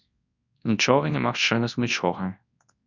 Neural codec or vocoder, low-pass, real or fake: codec, 24 kHz, 0.9 kbps, WavTokenizer, large speech release; 7.2 kHz; fake